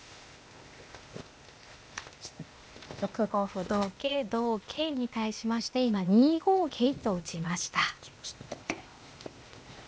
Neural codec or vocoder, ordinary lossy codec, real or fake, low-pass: codec, 16 kHz, 0.8 kbps, ZipCodec; none; fake; none